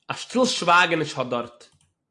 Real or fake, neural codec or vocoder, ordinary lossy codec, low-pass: real; none; AAC, 64 kbps; 10.8 kHz